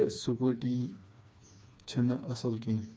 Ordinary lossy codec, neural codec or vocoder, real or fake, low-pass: none; codec, 16 kHz, 2 kbps, FreqCodec, smaller model; fake; none